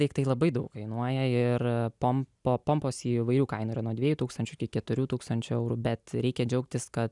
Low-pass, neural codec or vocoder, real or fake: 10.8 kHz; none; real